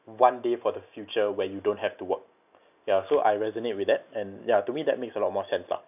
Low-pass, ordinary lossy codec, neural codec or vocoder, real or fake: 3.6 kHz; none; none; real